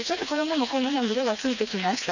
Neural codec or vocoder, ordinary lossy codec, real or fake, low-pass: codec, 16 kHz, 2 kbps, FreqCodec, smaller model; none; fake; 7.2 kHz